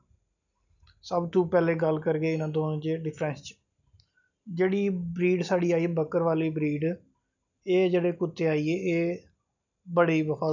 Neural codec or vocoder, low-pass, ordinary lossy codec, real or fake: none; 7.2 kHz; none; real